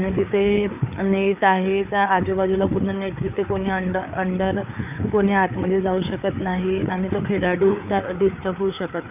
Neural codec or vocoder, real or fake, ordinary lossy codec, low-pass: codec, 16 kHz, 4 kbps, FreqCodec, larger model; fake; none; 3.6 kHz